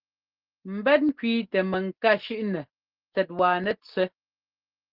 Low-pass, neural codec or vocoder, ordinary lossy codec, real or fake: 5.4 kHz; none; Opus, 16 kbps; real